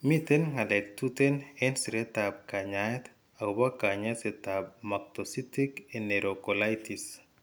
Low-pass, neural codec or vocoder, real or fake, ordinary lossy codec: none; none; real; none